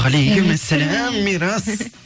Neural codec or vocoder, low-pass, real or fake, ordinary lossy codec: none; none; real; none